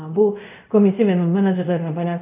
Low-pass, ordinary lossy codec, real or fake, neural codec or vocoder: 3.6 kHz; none; fake; codec, 24 kHz, 0.5 kbps, DualCodec